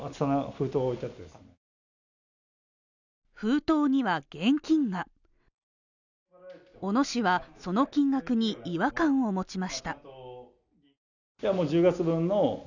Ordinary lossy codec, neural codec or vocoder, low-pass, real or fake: none; none; 7.2 kHz; real